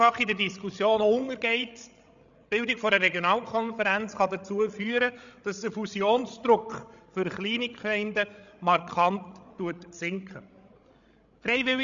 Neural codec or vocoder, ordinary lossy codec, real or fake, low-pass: codec, 16 kHz, 16 kbps, FreqCodec, larger model; none; fake; 7.2 kHz